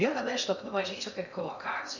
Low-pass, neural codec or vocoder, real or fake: 7.2 kHz; codec, 16 kHz in and 24 kHz out, 0.6 kbps, FocalCodec, streaming, 2048 codes; fake